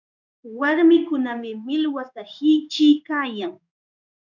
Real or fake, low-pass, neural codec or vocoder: fake; 7.2 kHz; codec, 16 kHz in and 24 kHz out, 1 kbps, XY-Tokenizer